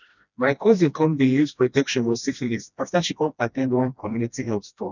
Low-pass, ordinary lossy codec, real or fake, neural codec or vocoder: 7.2 kHz; none; fake; codec, 16 kHz, 1 kbps, FreqCodec, smaller model